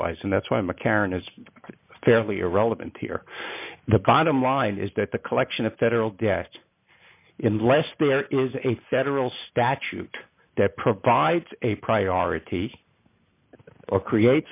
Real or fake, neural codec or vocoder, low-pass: real; none; 3.6 kHz